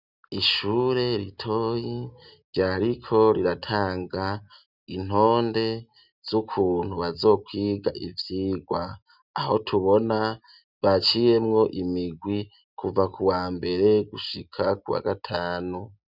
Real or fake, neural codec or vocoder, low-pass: real; none; 5.4 kHz